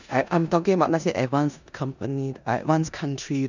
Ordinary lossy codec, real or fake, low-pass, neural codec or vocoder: none; fake; 7.2 kHz; codec, 16 kHz in and 24 kHz out, 0.9 kbps, LongCat-Audio-Codec, four codebook decoder